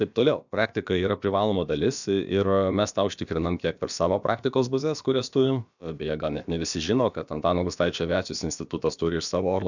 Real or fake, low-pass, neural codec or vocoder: fake; 7.2 kHz; codec, 16 kHz, about 1 kbps, DyCAST, with the encoder's durations